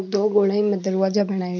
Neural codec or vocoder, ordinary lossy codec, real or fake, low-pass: none; none; real; 7.2 kHz